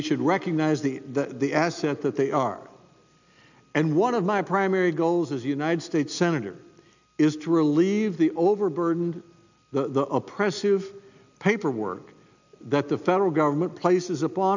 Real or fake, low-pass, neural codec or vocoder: real; 7.2 kHz; none